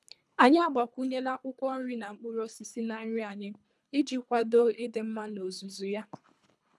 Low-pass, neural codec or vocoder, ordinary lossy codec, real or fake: none; codec, 24 kHz, 3 kbps, HILCodec; none; fake